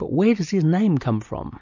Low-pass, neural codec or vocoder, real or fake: 7.2 kHz; none; real